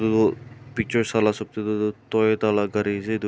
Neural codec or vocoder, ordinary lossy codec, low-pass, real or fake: none; none; none; real